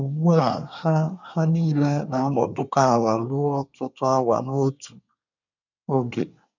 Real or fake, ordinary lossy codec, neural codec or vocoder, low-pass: fake; none; codec, 24 kHz, 1 kbps, SNAC; 7.2 kHz